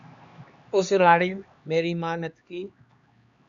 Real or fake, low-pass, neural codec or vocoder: fake; 7.2 kHz; codec, 16 kHz, 2 kbps, X-Codec, HuBERT features, trained on LibriSpeech